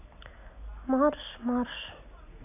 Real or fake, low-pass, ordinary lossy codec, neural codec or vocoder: real; 3.6 kHz; none; none